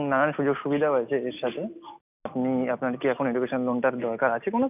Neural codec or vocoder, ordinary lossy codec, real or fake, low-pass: none; none; real; 3.6 kHz